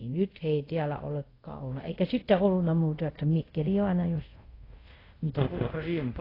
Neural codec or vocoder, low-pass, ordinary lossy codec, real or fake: codec, 24 kHz, 0.5 kbps, DualCodec; 5.4 kHz; AAC, 24 kbps; fake